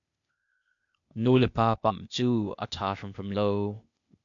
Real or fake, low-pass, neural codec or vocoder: fake; 7.2 kHz; codec, 16 kHz, 0.8 kbps, ZipCodec